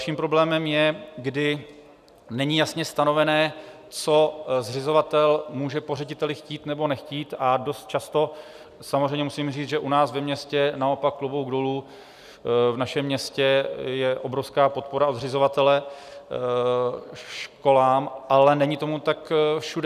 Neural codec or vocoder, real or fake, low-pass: none; real; 14.4 kHz